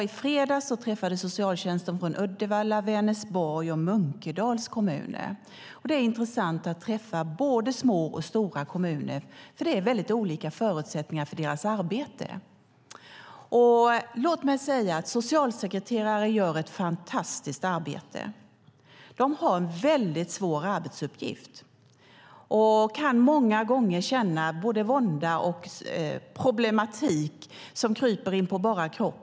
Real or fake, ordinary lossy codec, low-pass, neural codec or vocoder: real; none; none; none